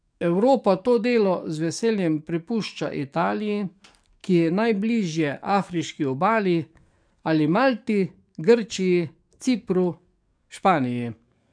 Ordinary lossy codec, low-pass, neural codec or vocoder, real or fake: none; 9.9 kHz; codec, 44.1 kHz, 7.8 kbps, DAC; fake